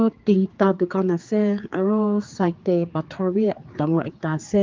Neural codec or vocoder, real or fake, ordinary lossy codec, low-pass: codec, 16 kHz, 4 kbps, X-Codec, HuBERT features, trained on general audio; fake; Opus, 32 kbps; 7.2 kHz